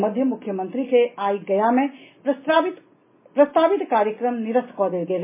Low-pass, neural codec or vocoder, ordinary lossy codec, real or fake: 3.6 kHz; none; MP3, 16 kbps; real